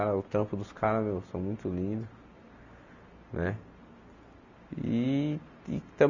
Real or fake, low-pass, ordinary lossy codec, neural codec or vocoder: real; 7.2 kHz; none; none